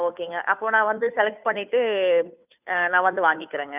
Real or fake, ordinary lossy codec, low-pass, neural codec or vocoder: fake; none; 3.6 kHz; codec, 16 kHz, 2 kbps, FunCodec, trained on Chinese and English, 25 frames a second